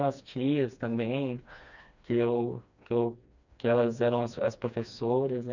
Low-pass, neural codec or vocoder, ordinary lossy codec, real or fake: 7.2 kHz; codec, 16 kHz, 2 kbps, FreqCodec, smaller model; none; fake